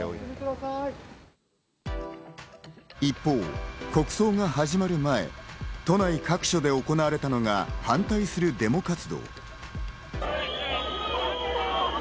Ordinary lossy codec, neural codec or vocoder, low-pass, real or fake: none; none; none; real